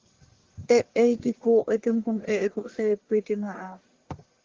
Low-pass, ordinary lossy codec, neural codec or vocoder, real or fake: 7.2 kHz; Opus, 16 kbps; codec, 44.1 kHz, 1.7 kbps, Pupu-Codec; fake